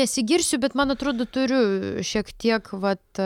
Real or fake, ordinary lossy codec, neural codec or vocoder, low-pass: real; MP3, 96 kbps; none; 19.8 kHz